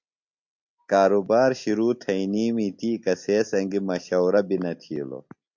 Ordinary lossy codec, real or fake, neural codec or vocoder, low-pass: MP3, 48 kbps; real; none; 7.2 kHz